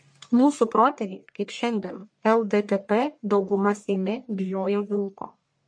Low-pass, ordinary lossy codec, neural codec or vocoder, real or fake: 9.9 kHz; MP3, 48 kbps; codec, 44.1 kHz, 1.7 kbps, Pupu-Codec; fake